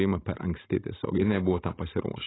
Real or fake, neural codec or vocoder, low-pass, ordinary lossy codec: real; none; 7.2 kHz; AAC, 16 kbps